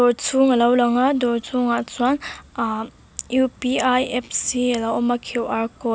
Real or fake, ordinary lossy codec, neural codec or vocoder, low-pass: real; none; none; none